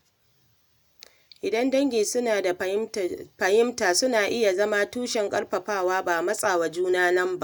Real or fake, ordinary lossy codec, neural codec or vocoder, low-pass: real; none; none; none